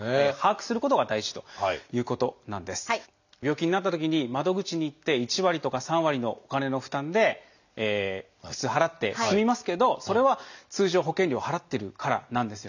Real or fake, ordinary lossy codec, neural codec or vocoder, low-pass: real; none; none; 7.2 kHz